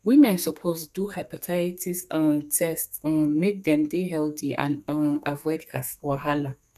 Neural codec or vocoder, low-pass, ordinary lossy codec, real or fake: codec, 32 kHz, 1.9 kbps, SNAC; 14.4 kHz; none; fake